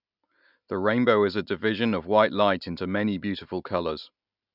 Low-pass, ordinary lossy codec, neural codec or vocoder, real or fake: 5.4 kHz; none; none; real